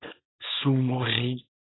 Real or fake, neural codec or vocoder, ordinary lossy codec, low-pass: fake; codec, 24 kHz, 0.9 kbps, WavTokenizer, small release; AAC, 16 kbps; 7.2 kHz